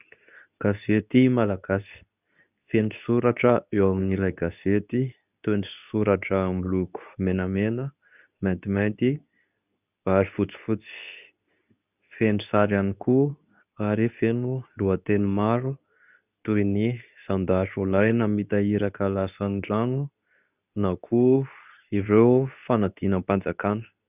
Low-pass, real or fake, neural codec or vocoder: 3.6 kHz; fake; codec, 24 kHz, 0.9 kbps, WavTokenizer, medium speech release version 2